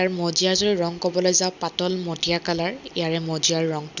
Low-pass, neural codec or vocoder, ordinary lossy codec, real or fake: 7.2 kHz; none; none; real